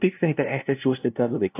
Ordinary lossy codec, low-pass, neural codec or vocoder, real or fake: AAC, 24 kbps; 3.6 kHz; codec, 16 kHz, 0.5 kbps, FunCodec, trained on LibriTTS, 25 frames a second; fake